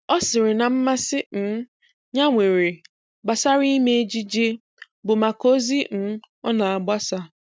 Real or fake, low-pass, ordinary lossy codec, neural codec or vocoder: real; none; none; none